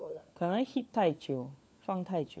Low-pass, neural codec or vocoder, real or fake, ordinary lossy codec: none; codec, 16 kHz, 4 kbps, FunCodec, trained on LibriTTS, 50 frames a second; fake; none